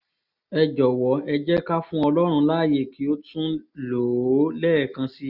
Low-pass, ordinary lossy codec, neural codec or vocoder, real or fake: 5.4 kHz; none; none; real